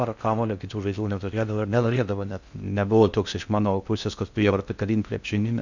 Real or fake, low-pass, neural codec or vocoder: fake; 7.2 kHz; codec, 16 kHz in and 24 kHz out, 0.6 kbps, FocalCodec, streaming, 4096 codes